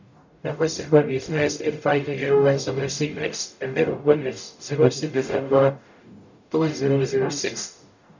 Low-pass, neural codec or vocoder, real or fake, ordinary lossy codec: 7.2 kHz; codec, 44.1 kHz, 0.9 kbps, DAC; fake; none